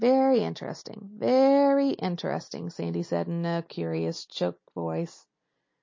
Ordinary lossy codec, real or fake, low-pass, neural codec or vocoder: MP3, 32 kbps; real; 7.2 kHz; none